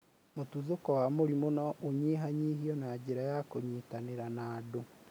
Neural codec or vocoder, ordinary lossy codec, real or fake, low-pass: none; none; real; none